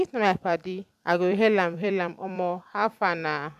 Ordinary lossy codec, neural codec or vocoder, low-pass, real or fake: none; vocoder, 44.1 kHz, 128 mel bands every 256 samples, BigVGAN v2; 14.4 kHz; fake